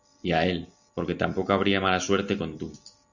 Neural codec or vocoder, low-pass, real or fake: none; 7.2 kHz; real